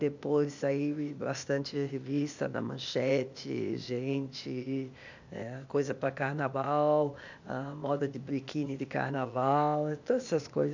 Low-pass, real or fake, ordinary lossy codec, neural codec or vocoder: 7.2 kHz; fake; none; codec, 16 kHz, 0.8 kbps, ZipCodec